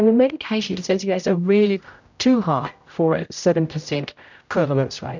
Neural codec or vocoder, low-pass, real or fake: codec, 16 kHz, 0.5 kbps, X-Codec, HuBERT features, trained on general audio; 7.2 kHz; fake